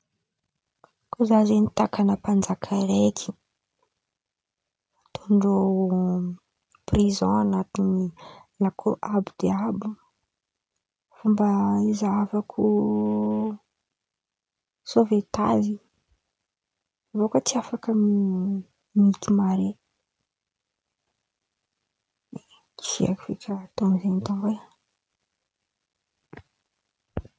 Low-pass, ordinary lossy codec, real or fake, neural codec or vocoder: none; none; real; none